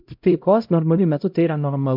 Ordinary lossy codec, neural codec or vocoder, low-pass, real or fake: MP3, 48 kbps; codec, 16 kHz, 0.5 kbps, X-Codec, HuBERT features, trained on LibriSpeech; 5.4 kHz; fake